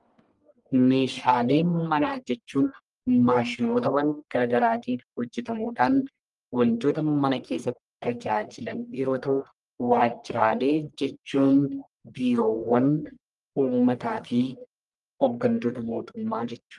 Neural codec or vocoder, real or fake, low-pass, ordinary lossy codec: codec, 44.1 kHz, 1.7 kbps, Pupu-Codec; fake; 10.8 kHz; Opus, 32 kbps